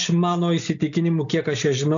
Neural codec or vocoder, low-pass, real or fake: none; 7.2 kHz; real